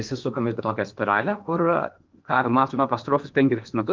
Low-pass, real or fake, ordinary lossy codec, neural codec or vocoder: 7.2 kHz; fake; Opus, 24 kbps; codec, 16 kHz in and 24 kHz out, 0.8 kbps, FocalCodec, streaming, 65536 codes